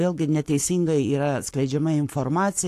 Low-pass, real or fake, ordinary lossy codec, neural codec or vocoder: 14.4 kHz; fake; AAC, 64 kbps; codec, 44.1 kHz, 7.8 kbps, Pupu-Codec